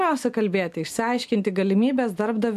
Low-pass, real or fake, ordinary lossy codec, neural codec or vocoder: 14.4 kHz; real; AAC, 96 kbps; none